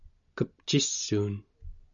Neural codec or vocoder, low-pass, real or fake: none; 7.2 kHz; real